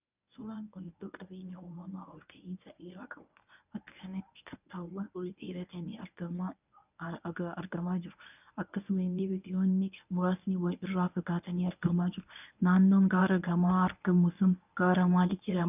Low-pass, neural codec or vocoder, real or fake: 3.6 kHz; codec, 24 kHz, 0.9 kbps, WavTokenizer, medium speech release version 1; fake